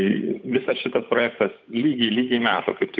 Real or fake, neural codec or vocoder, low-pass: fake; codec, 16 kHz, 8 kbps, FunCodec, trained on Chinese and English, 25 frames a second; 7.2 kHz